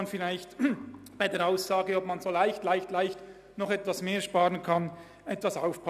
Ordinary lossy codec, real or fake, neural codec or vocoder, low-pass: none; real; none; 14.4 kHz